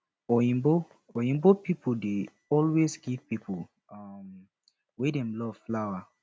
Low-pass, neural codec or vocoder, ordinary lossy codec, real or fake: none; none; none; real